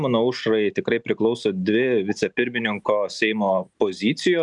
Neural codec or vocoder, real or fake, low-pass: none; real; 10.8 kHz